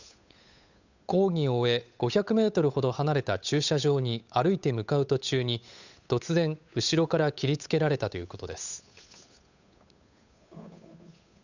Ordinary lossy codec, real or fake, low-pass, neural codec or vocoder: none; fake; 7.2 kHz; codec, 16 kHz, 8 kbps, FunCodec, trained on Chinese and English, 25 frames a second